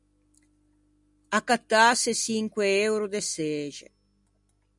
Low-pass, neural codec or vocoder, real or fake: 10.8 kHz; none; real